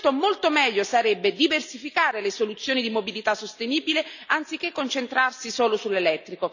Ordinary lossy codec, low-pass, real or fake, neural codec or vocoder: none; 7.2 kHz; real; none